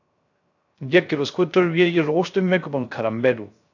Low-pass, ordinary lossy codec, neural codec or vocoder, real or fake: 7.2 kHz; AAC, 48 kbps; codec, 16 kHz, 0.3 kbps, FocalCodec; fake